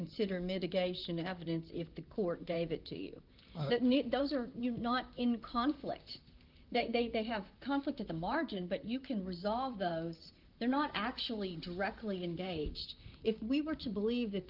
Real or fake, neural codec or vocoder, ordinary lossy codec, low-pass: fake; vocoder, 44.1 kHz, 128 mel bands, Pupu-Vocoder; Opus, 32 kbps; 5.4 kHz